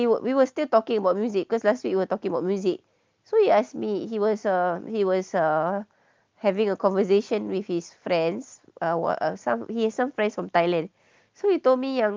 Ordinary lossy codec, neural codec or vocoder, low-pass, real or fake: Opus, 32 kbps; none; 7.2 kHz; real